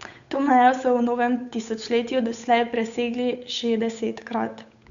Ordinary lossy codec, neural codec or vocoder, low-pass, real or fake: MP3, 96 kbps; codec, 16 kHz, 8 kbps, FunCodec, trained on Chinese and English, 25 frames a second; 7.2 kHz; fake